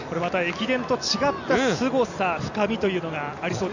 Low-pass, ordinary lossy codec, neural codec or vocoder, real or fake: 7.2 kHz; none; none; real